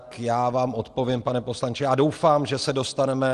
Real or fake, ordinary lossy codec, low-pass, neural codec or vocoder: real; Opus, 24 kbps; 10.8 kHz; none